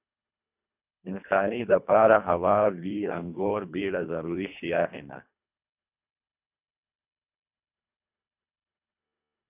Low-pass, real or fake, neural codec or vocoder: 3.6 kHz; fake; codec, 24 kHz, 1.5 kbps, HILCodec